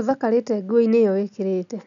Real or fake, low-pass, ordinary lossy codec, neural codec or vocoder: real; 7.2 kHz; none; none